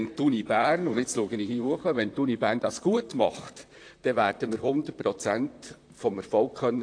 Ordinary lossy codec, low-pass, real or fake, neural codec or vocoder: AAC, 48 kbps; 9.9 kHz; fake; vocoder, 44.1 kHz, 128 mel bands, Pupu-Vocoder